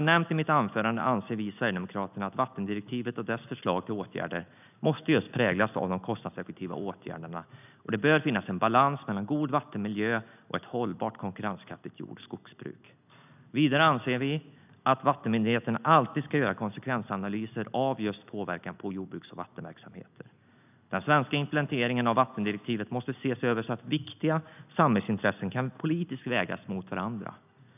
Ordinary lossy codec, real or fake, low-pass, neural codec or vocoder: none; real; 3.6 kHz; none